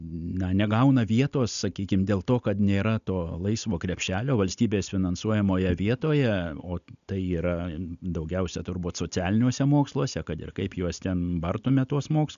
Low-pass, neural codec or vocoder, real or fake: 7.2 kHz; none; real